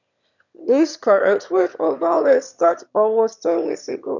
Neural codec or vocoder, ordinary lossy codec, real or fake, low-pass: autoencoder, 22.05 kHz, a latent of 192 numbers a frame, VITS, trained on one speaker; none; fake; 7.2 kHz